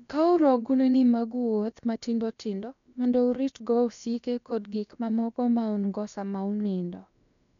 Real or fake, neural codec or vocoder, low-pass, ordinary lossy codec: fake; codec, 16 kHz, about 1 kbps, DyCAST, with the encoder's durations; 7.2 kHz; none